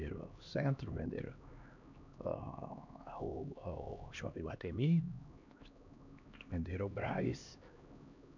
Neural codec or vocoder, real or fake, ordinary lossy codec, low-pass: codec, 16 kHz, 2 kbps, X-Codec, HuBERT features, trained on LibriSpeech; fake; none; 7.2 kHz